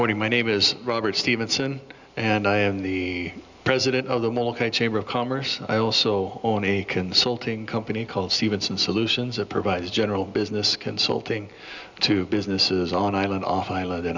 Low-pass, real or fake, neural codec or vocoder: 7.2 kHz; real; none